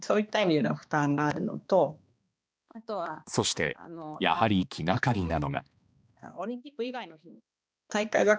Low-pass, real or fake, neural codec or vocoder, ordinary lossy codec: none; fake; codec, 16 kHz, 2 kbps, X-Codec, HuBERT features, trained on balanced general audio; none